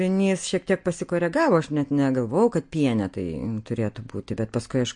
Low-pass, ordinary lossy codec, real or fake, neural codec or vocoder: 9.9 kHz; MP3, 48 kbps; real; none